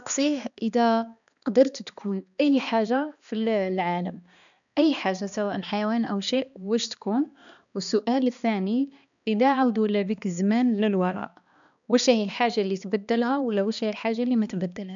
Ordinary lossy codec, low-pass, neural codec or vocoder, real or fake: none; 7.2 kHz; codec, 16 kHz, 2 kbps, X-Codec, HuBERT features, trained on balanced general audio; fake